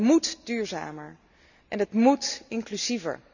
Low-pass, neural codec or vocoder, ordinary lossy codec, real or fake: 7.2 kHz; none; none; real